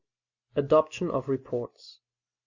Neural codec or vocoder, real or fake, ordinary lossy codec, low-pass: none; real; Opus, 64 kbps; 7.2 kHz